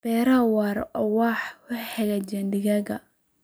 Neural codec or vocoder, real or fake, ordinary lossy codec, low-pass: none; real; none; none